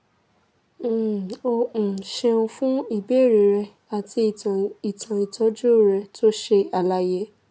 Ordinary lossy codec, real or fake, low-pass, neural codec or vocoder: none; real; none; none